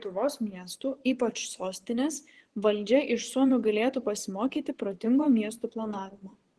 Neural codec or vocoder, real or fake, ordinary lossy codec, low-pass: vocoder, 24 kHz, 100 mel bands, Vocos; fake; Opus, 16 kbps; 10.8 kHz